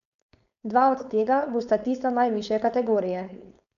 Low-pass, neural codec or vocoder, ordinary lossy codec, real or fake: 7.2 kHz; codec, 16 kHz, 4.8 kbps, FACodec; none; fake